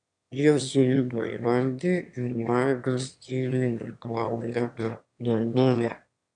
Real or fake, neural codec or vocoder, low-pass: fake; autoencoder, 22.05 kHz, a latent of 192 numbers a frame, VITS, trained on one speaker; 9.9 kHz